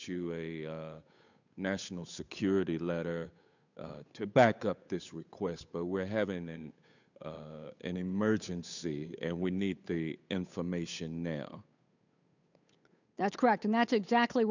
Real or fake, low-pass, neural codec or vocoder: fake; 7.2 kHz; codec, 16 kHz, 8 kbps, FunCodec, trained on Chinese and English, 25 frames a second